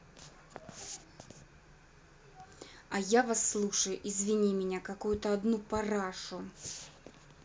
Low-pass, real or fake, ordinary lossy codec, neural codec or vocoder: none; real; none; none